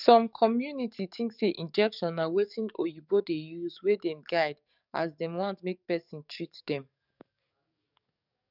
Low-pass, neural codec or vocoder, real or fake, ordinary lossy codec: 5.4 kHz; codec, 44.1 kHz, 7.8 kbps, DAC; fake; none